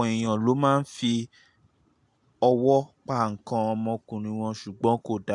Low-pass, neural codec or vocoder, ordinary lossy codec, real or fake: 10.8 kHz; none; AAC, 64 kbps; real